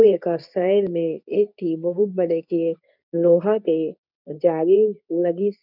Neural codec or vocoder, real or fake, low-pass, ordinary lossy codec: codec, 24 kHz, 0.9 kbps, WavTokenizer, medium speech release version 2; fake; 5.4 kHz; none